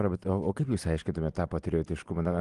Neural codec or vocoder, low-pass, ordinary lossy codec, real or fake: none; 10.8 kHz; Opus, 24 kbps; real